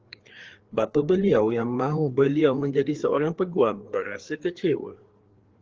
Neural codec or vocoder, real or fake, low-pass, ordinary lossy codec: codec, 16 kHz, 4 kbps, FreqCodec, larger model; fake; 7.2 kHz; Opus, 16 kbps